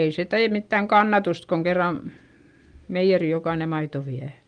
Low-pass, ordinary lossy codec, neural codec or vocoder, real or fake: 9.9 kHz; Opus, 24 kbps; none; real